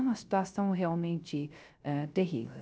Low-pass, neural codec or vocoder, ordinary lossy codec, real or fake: none; codec, 16 kHz, 0.3 kbps, FocalCodec; none; fake